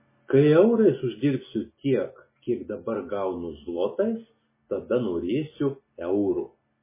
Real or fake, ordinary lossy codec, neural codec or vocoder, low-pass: real; MP3, 16 kbps; none; 3.6 kHz